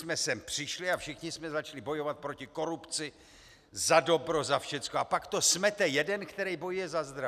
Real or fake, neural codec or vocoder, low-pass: real; none; 14.4 kHz